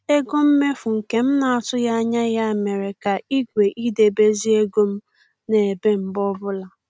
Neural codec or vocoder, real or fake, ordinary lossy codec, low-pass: none; real; none; none